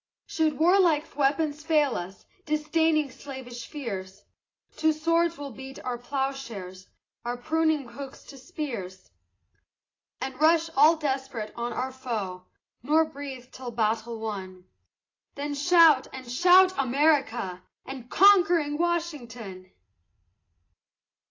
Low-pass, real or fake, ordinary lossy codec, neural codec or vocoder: 7.2 kHz; real; AAC, 32 kbps; none